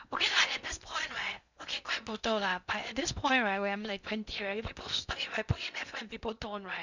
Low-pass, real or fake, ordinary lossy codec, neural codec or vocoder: 7.2 kHz; fake; none; codec, 16 kHz in and 24 kHz out, 0.8 kbps, FocalCodec, streaming, 65536 codes